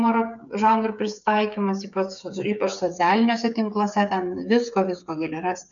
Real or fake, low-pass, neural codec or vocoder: fake; 7.2 kHz; codec, 16 kHz, 8 kbps, FreqCodec, smaller model